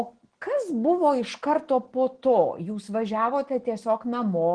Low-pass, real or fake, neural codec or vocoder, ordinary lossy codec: 9.9 kHz; real; none; Opus, 16 kbps